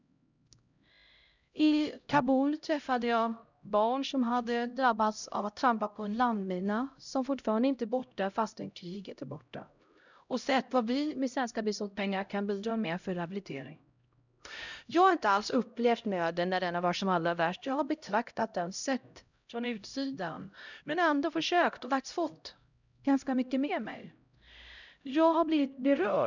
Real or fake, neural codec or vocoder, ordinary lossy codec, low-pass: fake; codec, 16 kHz, 0.5 kbps, X-Codec, HuBERT features, trained on LibriSpeech; none; 7.2 kHz